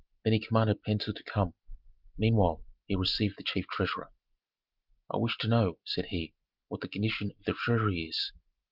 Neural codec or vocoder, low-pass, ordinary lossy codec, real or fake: none; 5.4 kHz; Opus, 32 kbps; real